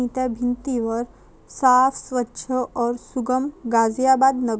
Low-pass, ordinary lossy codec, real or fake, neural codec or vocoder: none; none; real; none